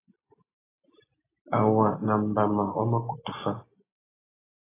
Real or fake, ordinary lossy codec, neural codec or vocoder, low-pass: real; AAC, 16 kbps; none; 3.6 kHz